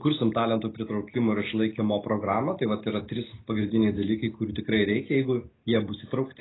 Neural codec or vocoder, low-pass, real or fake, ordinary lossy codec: none; 7.2 kHz; real; AAC, 16 kbps